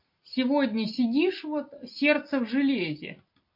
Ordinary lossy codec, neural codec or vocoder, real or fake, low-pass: MP3, 32 kbps; none; real; 5.4 kHz